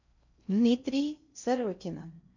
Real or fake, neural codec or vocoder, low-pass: fake; codec, 16 kHz in and 24 kHz out, 0.6 kbps, FocalCodec, streaming, 4096 codes; 7.2 kHz